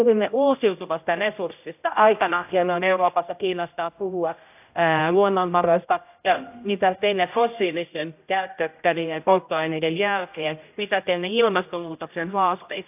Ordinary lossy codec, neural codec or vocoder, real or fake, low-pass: AAC, 32 kbps; codec, 16 kHz, 0.5 kbps, X-Codec, HuBERT features, trained on general audio; fake; 3.6 kHz